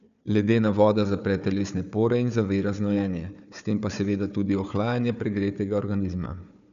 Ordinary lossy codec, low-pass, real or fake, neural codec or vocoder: none; 7.2 kHz; fake; codec, 16 kHz, 4 kbps, FunCodec, trained on Chinese and English, 50 frames a second